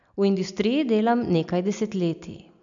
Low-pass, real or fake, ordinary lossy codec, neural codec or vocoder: 7.2 kHz; real; none; none